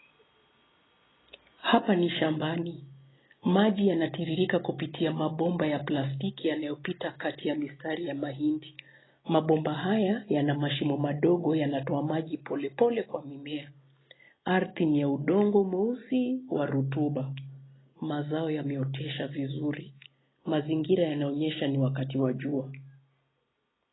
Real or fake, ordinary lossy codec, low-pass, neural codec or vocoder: real; AAC, 16 kbps; 7.2 kHz; none